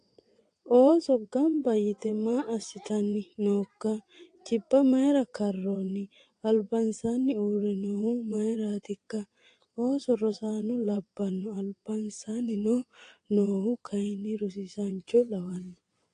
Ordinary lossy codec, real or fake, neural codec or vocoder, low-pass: AAC, 48 kbps; fake; vocoder, 22.05 kHz, 80 mel bands, WaveNeXt; 9.9 kHz